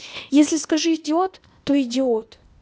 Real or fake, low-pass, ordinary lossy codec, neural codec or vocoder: fake; none; none; codec, 16 kHz, 0.8 kbps, ZipCodec